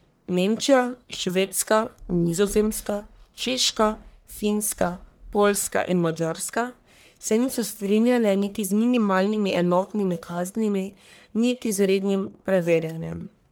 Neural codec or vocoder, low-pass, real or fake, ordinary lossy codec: codec, 44.1 kHz, 1.7 kbps, Pupu-Codec; none; fake; none